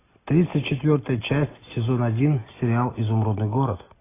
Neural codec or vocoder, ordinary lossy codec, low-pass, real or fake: none; AAC, 16 kbps; 3.6 kHz; real